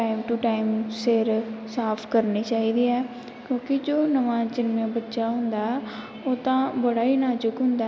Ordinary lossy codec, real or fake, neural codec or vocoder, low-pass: none; real; none; none